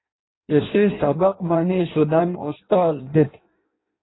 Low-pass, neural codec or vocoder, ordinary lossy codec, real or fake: 7.2 kHz; codec, 16 kHz in and 24 kHz out, 0.6 kbps, FireRedTTS-2 codec; AAC, 16 kbps; fake